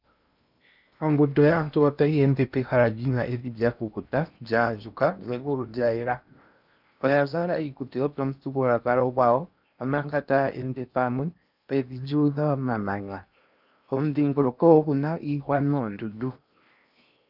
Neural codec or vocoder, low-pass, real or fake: codec, 16 kHz in and 24 kHz out, 0.8 kbps, FocalCodec, streaming, 65536 codes; 5.4 kHz; fake